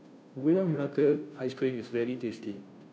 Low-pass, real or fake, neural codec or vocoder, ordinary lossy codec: none; fake; codec, 16 kHz, 0.5 kbps, FunCodec, trained on Chinese and English, 25 frames a second; none